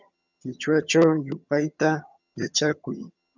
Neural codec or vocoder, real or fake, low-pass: vocoder, 22.05 kHz, 80 mel bands, HiFi-GAN; fake; 7.2 kHz